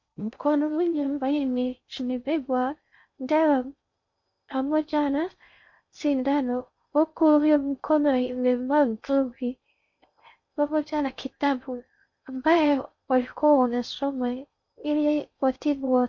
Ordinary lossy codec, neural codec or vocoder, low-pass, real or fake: MP3, 48 kbps; codec, 16 kHz in and 24 kHz out, 0.6 kbps, FocalCodec, streaming, 2048 codes; 7.2 kHz; fake